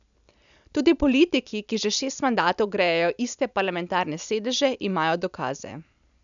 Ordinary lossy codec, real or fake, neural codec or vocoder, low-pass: none; real; none; 7.2 kHz